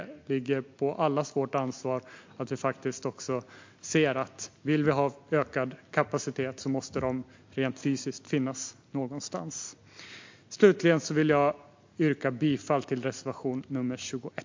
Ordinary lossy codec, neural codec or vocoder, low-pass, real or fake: MP3, 48 kbps; none; 7.2 kHz; real